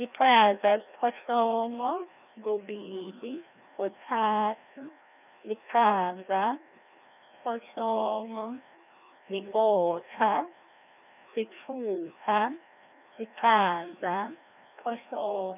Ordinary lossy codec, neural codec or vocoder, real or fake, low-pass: none; codec, 16 kHz, 1 kbps, FreqCodec, larger model; fake; 3.6 kHz